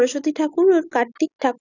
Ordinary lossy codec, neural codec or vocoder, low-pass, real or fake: none; none; 7.2 kHz; real